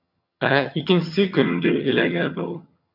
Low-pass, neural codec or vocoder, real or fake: 5.4 kHz; vocoder, 22.05 kHz, 80 mel bands, HiFi-GAN; fake